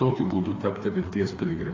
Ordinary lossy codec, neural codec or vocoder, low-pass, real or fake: AAC, 32 kbps; codec, 16 kHz, 2 kbps, FreqCodec, larger model; 7.2 kHz; fake